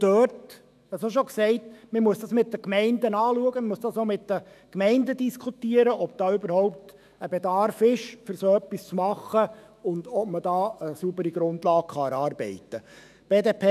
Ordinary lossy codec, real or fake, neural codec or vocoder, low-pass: none; fake; autoencoder, 48 kHz, 128 numbers a frame, DAC-VAE, trained on Japanese speech; 14.4 kHz